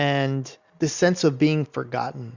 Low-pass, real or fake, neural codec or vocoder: 7.2 kHz; real; none